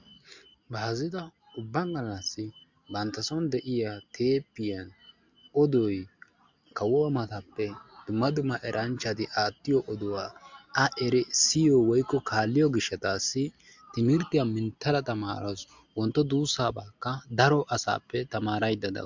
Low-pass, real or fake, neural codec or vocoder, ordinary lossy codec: 7.2 kHz; real; none; MP3, 64 kbps